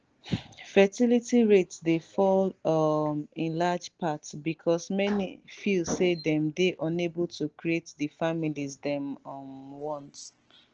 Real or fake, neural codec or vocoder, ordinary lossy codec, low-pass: real; none; Opus, 16 kbps; 7.2 kHz